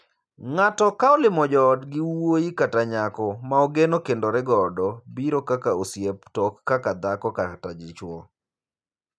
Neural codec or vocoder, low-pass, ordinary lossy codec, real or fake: none; none; none; real